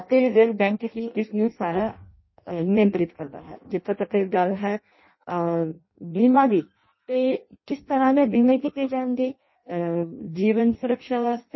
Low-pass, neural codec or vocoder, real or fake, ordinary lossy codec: 7.2 kHz; codec, 16 kHz in and 24 kHz out, 0.6 kbps, FireRedTTS-2 codec; fake; MP3, 24 kbps